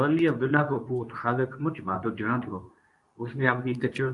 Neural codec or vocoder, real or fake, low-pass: codec, 24 kHz, 0.9 kbps, WavTokenizer, medium speech release version 2; fake; 10.8 kHz